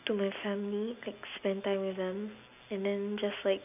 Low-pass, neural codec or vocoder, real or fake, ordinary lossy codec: 3.6 kHz; none; real; none